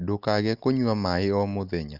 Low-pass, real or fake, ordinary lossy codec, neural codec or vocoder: 5.4 kHz; real; Opus, 24 kbps; none